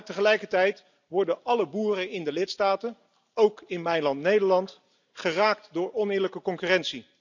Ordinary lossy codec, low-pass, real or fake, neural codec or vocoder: none; 7.2 kHz; real; none